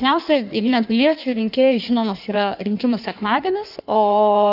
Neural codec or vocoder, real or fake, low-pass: codec, 16 kHz in and 24 kHz out, 1.1 kbps, FireRedTTS-2 codec; fake; 5.4 kHz